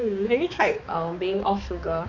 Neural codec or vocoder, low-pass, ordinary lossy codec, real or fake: codec, 16 kHz, 2 kbps, X-Codec, HuBERT features, trained on balanced general audio; 7.2 kHz; MP3, 48 kbps; fake